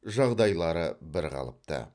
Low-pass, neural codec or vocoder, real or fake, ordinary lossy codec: 9.9 kHz; none; real; none